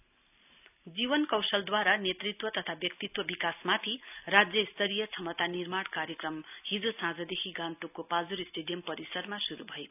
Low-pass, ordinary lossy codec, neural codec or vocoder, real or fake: 3.6 kHz; none; none; real